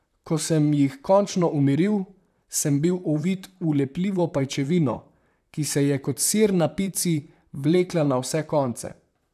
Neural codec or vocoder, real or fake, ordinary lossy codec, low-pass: vocoder, 44.1 kHz, 128 mel bands, Pupu-Vocoder; fake; none; 14.4 kHz